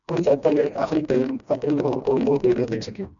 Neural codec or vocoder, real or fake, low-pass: codec, 16 kHz, 1 kbps, FreqCodec, smaller model; fake; 7.2 kHz